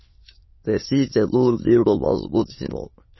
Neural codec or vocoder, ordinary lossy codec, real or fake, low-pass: autoencoder, 22.05 kHz, a latent of 192 numbers a frame, VITS, trained on many speakers; MP3, 24 kbps; fake; 7.2 kHz